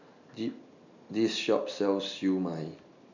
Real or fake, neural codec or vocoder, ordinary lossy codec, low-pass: real; none; none; 7.2 kHz